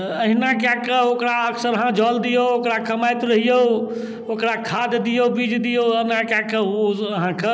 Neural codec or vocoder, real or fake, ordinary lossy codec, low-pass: none; real; none; none